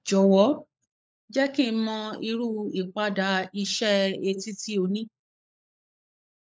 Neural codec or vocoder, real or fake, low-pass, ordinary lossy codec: codec, 16 kHz, 16 kbps, FunCodec, trained on LibriTTS, 50 frames a second; fake; none; none